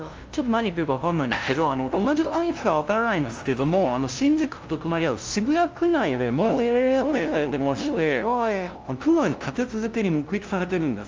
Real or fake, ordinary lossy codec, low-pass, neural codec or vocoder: fake; Opus, 24 kbps; 7.2 kHz; codec, 16 kHz, 0.5 kbps, FunCodec, trained on LibriTTS, 25 frames a second